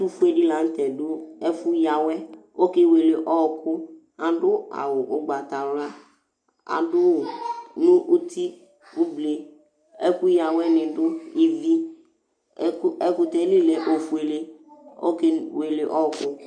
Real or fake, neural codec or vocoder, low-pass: real; none; 9.9 kHz